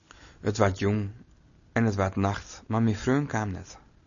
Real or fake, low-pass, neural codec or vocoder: real; 7.2 kHz; none